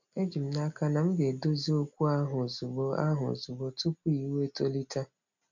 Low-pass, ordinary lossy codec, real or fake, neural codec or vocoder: 7.2 kHz; none; real; none